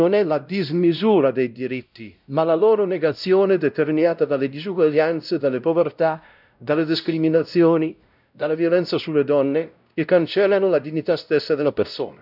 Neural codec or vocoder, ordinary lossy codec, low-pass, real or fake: codec, 16 kHz, 0.5 kbps, X-Codec, WavLM features, trained on Multilingual LibriSpeech; none; 5.4 kHz; fake